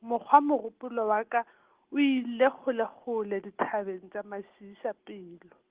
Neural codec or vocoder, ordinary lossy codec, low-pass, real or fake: none; Opus, 16 kbps; 3.6 kHz; real